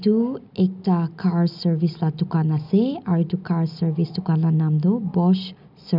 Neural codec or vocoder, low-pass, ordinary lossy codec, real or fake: none; 5.4 kHz; none; real